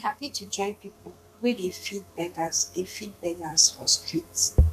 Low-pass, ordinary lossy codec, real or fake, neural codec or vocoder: 14.4 kHz; none; fake; codec, 32 kHz, 1.9 kbps, SNAC